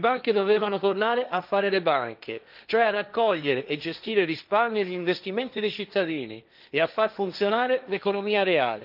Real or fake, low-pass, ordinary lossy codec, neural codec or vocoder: fake; 5.4 kHz; none; codec, 16 kHz, 1.1 kbps, Voila-Tokenizer